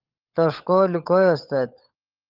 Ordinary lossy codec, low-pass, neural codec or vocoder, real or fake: Opus, 32 kbps; 5.4 kHz; codec, 16 kHz, 16 kbps, FunCodec, trained on LibriTTS, 50 frames a second; fake